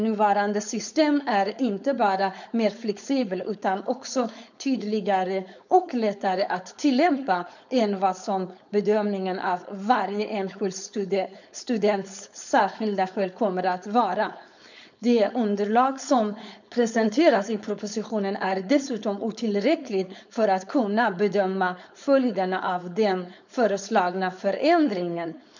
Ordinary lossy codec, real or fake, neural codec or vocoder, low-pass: none; fake; codec, 16 kHz, 4.8 kbps, FACodec; 7.2 kHz